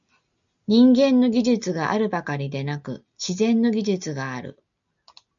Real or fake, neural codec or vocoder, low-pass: real; none; 7.2 kHz